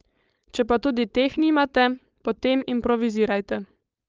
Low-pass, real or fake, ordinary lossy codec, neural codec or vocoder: 7.2 kHz; fake; Opus, 24 kbps; codec, 16 kHz, 4.8 kbps, FACodec